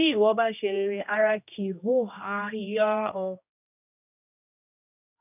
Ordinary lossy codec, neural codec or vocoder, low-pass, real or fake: none; codec, 16 kHz, 1 kbps, X-Codec, HuBERT features, trained on general audio; 3.6 kHz; fake